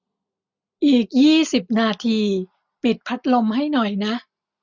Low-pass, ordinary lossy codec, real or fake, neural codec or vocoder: 7.2 kHz; none; real; none